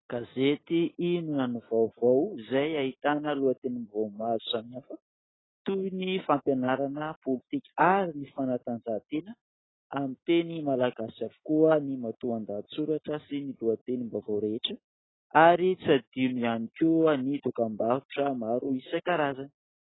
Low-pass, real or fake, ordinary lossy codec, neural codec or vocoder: 7.2 kHz; real; AAC, 16 kbps; none